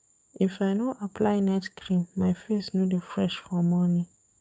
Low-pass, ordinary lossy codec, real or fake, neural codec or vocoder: none; none; fake; codec, 16 kHz, 6 kbps, DAC